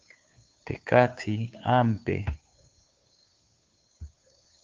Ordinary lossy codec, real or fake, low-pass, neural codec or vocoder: Opus, 24 kbps; fake; 7.2 kHz; codec, 16 kHz, 2 kbps, FunCodec, trained on Chinese and English, 25 frames a second